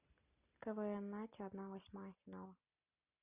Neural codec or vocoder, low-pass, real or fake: none; 3.6 kHz; real